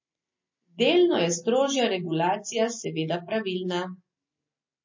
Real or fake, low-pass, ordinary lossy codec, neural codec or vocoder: real; 7.2 kHz; MP3, 32 kbps; none